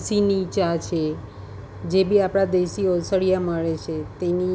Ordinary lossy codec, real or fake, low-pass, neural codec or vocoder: none; real; none; none